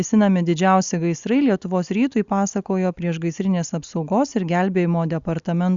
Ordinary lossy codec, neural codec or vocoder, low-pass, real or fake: Opus, 64 kbps; none; 7.2 kHz; real